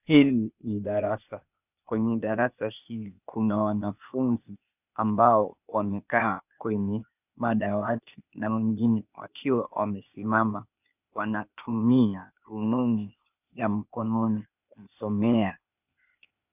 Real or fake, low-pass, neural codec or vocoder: fake; 3.6 kHz; codec, 16 kHz, 0.8 kbps, ZipCodec